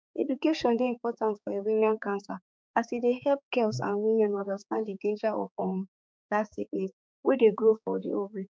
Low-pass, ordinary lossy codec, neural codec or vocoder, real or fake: none; none; codec, 16 kHz, 4 kbps, X-Codec, HuBERT features, trained on balanced general audio; fake